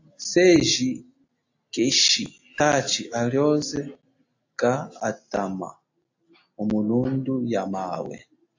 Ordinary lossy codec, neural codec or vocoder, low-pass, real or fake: AAC, 48 kbps; none; 7.2 kHz; real